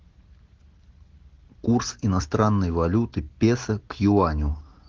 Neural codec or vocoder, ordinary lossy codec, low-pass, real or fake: none; Opus, 16 kbps; 7.2 kHz; real